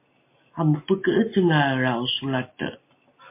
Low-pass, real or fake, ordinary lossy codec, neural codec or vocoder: 3.6 kHz; real; MP3, 24 kbps; none